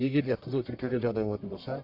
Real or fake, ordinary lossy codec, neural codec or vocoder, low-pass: fake; MP3, 48 kbps; codec, 44.1 kHz, 1.7 kbps, Pupu-Codec; 5.4 kHz